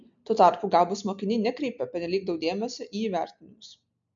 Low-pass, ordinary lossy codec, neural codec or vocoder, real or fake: 7.2 kHz; MP3, 64 kbps; none; real